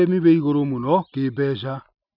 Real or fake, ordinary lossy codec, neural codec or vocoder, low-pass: real; none; none; 5.4 kHz